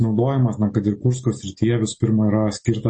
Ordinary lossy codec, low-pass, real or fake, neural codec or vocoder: MP3, 32 kbps; 10.8 kHz; real; none